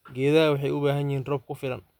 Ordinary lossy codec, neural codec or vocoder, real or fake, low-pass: none; none; real; 19.8 kHz